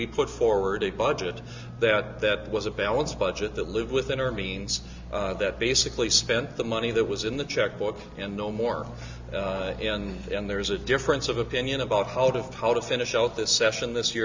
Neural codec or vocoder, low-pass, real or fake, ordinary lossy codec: none; 7.2 kHz; real; MP3, 64 kbps